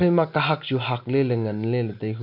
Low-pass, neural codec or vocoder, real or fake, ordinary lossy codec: 5.4 kHz; none; real; none